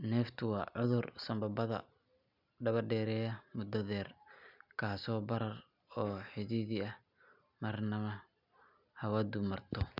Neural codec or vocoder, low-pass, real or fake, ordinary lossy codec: none; 5.4 kHz; real; none